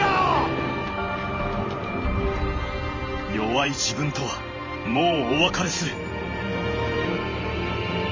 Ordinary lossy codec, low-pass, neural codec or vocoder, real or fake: MP3, 32 kbps; 7.2 kHz; vocoder, 44.1 kHz, 128 mel bands every 512 samples, BigVGAN v2; fake